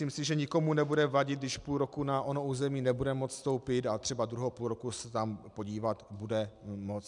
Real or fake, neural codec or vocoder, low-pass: real; none; 10.8 kHz